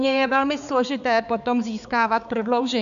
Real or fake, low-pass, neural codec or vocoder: fake; 7.2 kHz; codec, 16 kHz, 4 kbps, X-Codec, HuBERT features, trained on balanced general audio